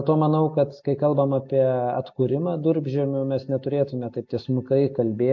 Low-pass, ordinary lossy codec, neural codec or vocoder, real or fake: 7.2 kHz; MP3, 48 kbps; none; real